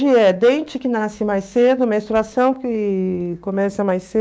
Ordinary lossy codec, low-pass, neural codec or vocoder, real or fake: none; none; codec, 16 kHz, 6 kbps, DAC; fake